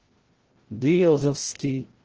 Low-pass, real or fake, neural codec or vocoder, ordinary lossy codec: 7.2 kHz; fake; codec, 16 kHz, 0.5 kbps, FreqCodec, larger model; Opus, 16 kbps